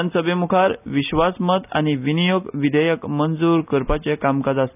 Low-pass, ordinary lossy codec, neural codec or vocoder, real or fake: 3.6 kHz; none; none; real